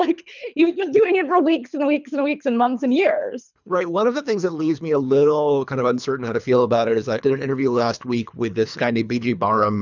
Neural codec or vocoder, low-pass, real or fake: codec, 24 kHz, 3 kbps, HILCodec; 7.2 kHz; fake